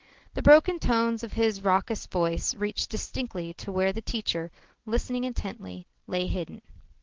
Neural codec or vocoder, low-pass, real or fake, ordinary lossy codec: none; 7.2 kHz; real; Opus, 16 kbps